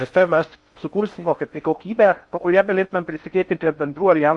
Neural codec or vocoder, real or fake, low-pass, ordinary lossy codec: codec, 16 kHz in and 24 kHz out, 0.6 kbps, FocalCodec, streaming, 4096 codes; fake; 10.8 kHz; Opus, 64 kbps